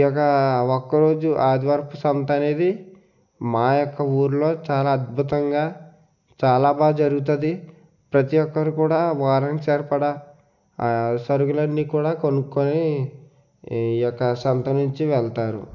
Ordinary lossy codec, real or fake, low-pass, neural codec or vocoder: none; real; 7.2 kHz; none